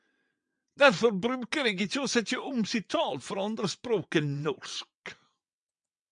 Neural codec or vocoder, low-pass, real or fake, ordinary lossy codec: vocoder, 22.05 kHz, 80 mel bands, WaveNeXt; 9.9 kHz; fake; MP3, 96 kbps